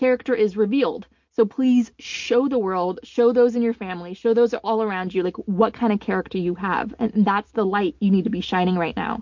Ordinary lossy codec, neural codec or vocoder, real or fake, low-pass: MP3, 48 kbps; none; real; 7.2 kHz